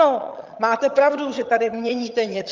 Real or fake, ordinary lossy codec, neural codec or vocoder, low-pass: fake; Opus, 24 kbps; vocoder, 22.05 kHz, 80 mel bands, HiFi-GAN; 7.2 kHz